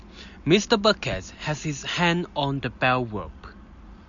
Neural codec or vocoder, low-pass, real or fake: none; 7.2 kHz; real